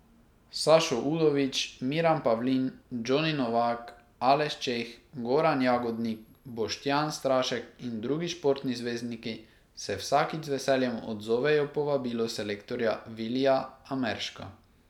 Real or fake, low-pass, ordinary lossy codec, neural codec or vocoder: real; 19.8 kHz; none; none